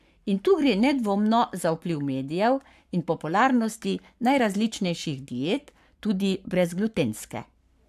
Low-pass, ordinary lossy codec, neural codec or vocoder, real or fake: 14.4 kHz; AAC, 96 kbps; codec, 44.1 kHz, 7.8 kbps, Pupu-Codec; fake